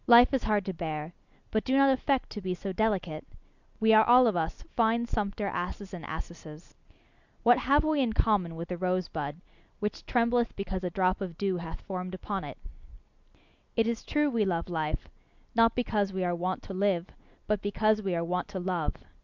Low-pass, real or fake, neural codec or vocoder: 7.2 kHz; real; none